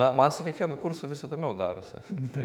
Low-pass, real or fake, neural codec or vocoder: 19.8 kHz; fake; autoencoder, 48 kHz, 32 numbers a frame, DAC-VAE, trained on Japanese speech